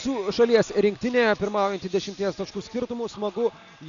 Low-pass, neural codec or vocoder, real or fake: 7.2 kHz; none; real